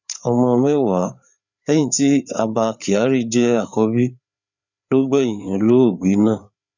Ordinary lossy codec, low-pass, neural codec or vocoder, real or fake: none; 7.2 kHz; codec, 16 kHz, 4 kbps, FreqCodec, larger model; fake